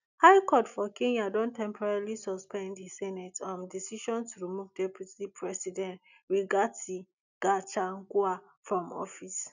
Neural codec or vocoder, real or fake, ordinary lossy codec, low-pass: none; real; none; 7.2 kHz